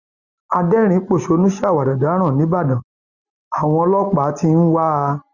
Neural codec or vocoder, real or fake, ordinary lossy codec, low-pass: none; real; Opus, 64 kbps; 7.2 kHz